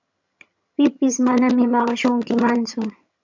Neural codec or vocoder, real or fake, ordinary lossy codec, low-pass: vocoder, 22.05 kHz, 80 mel bands, HiFi-GAN; fake; MP3, 64 kbps; 7.2 kHz